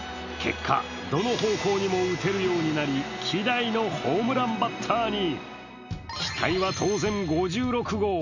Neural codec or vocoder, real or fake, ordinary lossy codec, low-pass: none; real; none; 7.2 kHz